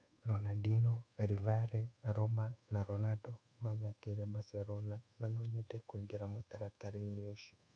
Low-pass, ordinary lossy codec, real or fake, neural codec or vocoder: 9.9 kHz; none; fake; codec, 24 kHz, 1.2 kbps, DualCodec